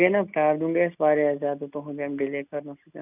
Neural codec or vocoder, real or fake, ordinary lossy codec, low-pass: none; real; none; 3.6 kHz